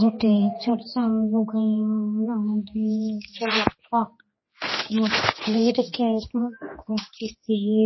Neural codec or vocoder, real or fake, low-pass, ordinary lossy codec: codec, 16 kHz, 2 kbps, X-Codec, HuBERT features, trained on general audio; fake; 7.2 kHz; MP3, 24 kbps